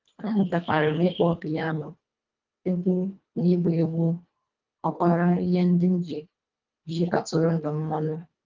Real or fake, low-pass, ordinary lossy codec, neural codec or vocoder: fake; 7.2 kHz; Opus, 24 kbps; codec, 24 kHz, 1.5 kbps, HILCodec